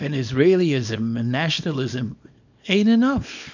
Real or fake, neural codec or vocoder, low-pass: fake; codec, 24 kHz, 0.9 kbps, WavTokenizer, small release; 7.2 kHz